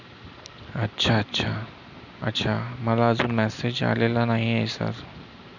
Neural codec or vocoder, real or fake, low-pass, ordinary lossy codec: none; real; 7.2 kHz; none